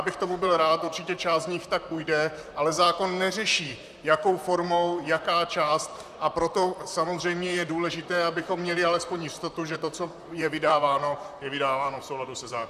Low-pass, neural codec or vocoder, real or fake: 14.4 kHz; vocoder, 44.1 kHz, 128 mel bands, Pupu-Vocoder; fake